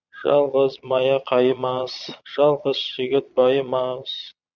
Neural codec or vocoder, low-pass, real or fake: vocoder, 22.05 kHz, 80 mel bands, Vocos; 7.2 kHz; fake